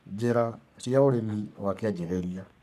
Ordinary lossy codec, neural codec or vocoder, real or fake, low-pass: none; codec, 44.1 kHz, 3.4 kbps, Pupu-Codec; fake; 14.4 kHz